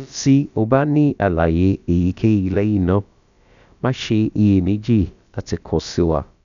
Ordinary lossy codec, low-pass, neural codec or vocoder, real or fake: none; 7.2 kHz; codec, 16 kHz, about 1 kbps, DyCAST, with the encoder's durations; fake